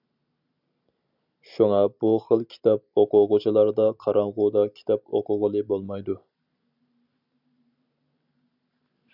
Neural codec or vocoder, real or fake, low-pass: none; real; 5.4 kHz